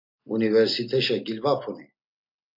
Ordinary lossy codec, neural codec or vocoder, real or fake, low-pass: MP3, 32 kbps; none; real; 5.4 kHz